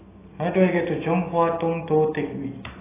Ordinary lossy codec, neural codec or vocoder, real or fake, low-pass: AAC, 16 kbps; none; real; 3.6 kHz